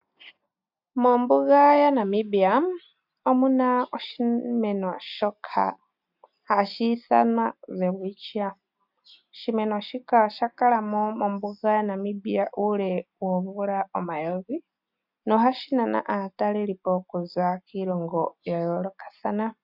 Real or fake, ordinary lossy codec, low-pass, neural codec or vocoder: real; MP3, 48 kbps; 5.4 kHz; none